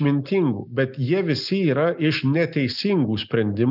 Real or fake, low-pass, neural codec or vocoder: real; 5.4 kHz; none